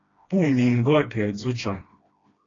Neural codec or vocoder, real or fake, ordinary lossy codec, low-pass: codec, 16 kHz, 1 kbps, FreqCodec, smaller model; fake; AAC, 32 kbps; 7.2 kHz